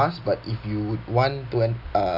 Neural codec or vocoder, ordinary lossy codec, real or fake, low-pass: none; none; real; 5.4 kHz